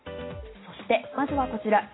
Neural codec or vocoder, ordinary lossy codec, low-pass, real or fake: none; AAC, 16 kbps; 7.2 kHz; real